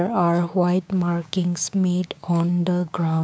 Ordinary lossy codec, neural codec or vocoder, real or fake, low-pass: none; codec, 16 kHz, 6 kbps, DAC; fake; none